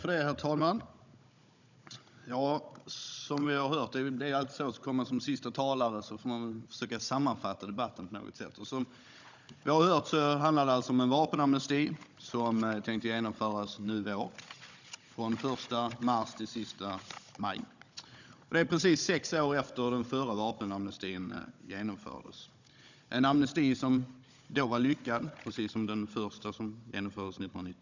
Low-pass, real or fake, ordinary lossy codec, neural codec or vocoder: 7.2 kHz; fake; none; codec, 16 kHz, 16 kbps, FunCodec, trained on Chinese and English, 50 frames a second